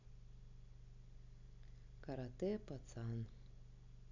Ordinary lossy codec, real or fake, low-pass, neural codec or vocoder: none; real; 7.2 kHz; none